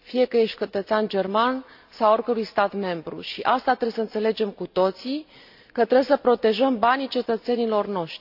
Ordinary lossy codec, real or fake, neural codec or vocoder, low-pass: AAC, 48 kbps; real; none; 5.4 kHz